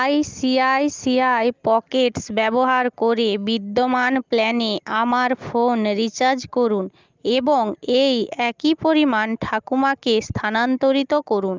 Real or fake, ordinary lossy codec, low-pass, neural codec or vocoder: real; Opus, 24 kbps; 7.2 kHz; none